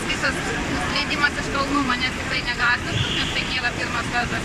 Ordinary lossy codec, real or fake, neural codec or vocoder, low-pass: MP3, 96 kbps; fake; vocoder, 44.1 kHz, 128 mel bands, Pupu-Vocoder; 14.4 kHz